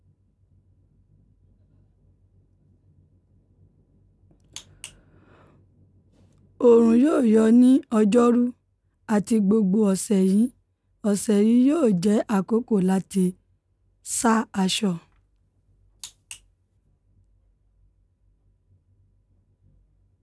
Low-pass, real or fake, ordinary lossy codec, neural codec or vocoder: none; real; none; none